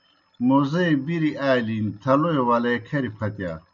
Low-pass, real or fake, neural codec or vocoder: 7.2 kHz; real; none